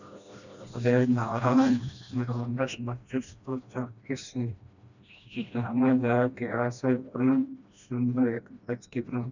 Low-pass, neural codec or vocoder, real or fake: 7.2 kHz; codec, 16 kHz, 1 kbps, FreqCodec, smaller model; fake